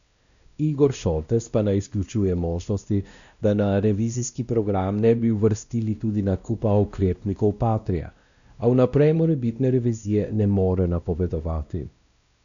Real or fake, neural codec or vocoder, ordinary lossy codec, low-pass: fake; codec, 16 kHz, 1 kbps, X-Codec, WavLM features, trained on Multilingual LibriSpeech; none; 7.2 kHz